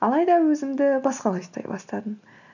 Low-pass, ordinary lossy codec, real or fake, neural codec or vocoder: 7.2 kHz; none; real; none